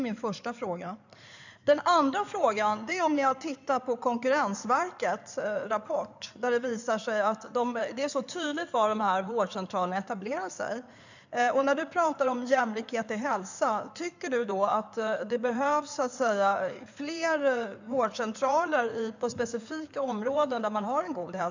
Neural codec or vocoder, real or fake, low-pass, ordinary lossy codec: codec, 16 kHz in and 24 kHz out, 2.2 kbps, FireRedTTS-2 codec; fake; 7.2 kHz; none